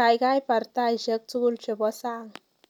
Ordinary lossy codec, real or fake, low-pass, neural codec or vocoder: none; real; 19.8 kHz; none